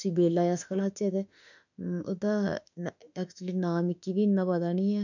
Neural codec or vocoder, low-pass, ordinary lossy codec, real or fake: autoencoder, 48 kHz, 32 numbers a frame, DAC-VAE, trained on Japanese speech; 7.2 kHz; none; fake